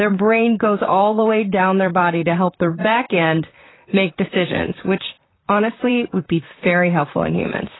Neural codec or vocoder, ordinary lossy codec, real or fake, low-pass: vocoder, 44.1 kHz, 128 mel bands, Pupu-Vocoder; AAC, 16 kbps; fake; 7.2 kHz